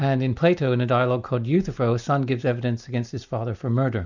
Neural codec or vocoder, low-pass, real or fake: none; 7.2 kHz; real